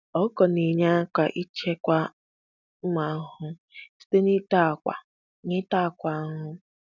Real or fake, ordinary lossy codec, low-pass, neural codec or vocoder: real; none; 7.2 kHz; none